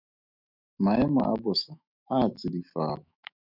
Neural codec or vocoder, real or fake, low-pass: autoencoder, 48 kHz, 128 numbers a frame, DAC-VAE, trained on Japanese speech; fake; 5.4 kHz